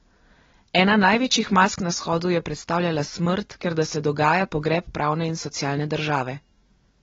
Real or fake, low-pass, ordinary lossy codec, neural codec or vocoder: real; 7.2 kHz; AAC, 24 kbps; none